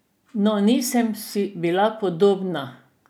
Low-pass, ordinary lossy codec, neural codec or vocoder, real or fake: none; none; none; real